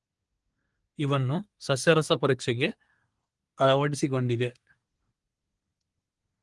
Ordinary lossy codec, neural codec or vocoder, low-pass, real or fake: Opus, 24 kbps; codec, 24 kHz, 1 kbps, SNAC; 10.8 kHz; fake